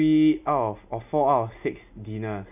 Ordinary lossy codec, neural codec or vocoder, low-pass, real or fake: none; none; 3.6 kHz; real